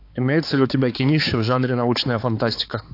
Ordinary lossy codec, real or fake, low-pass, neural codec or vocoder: AAC, 32 kbps; fake; 5.4 kHz; codec, 16 kHz, 4 kbps, X-Codec, HuBERT features, trained on balanced general audio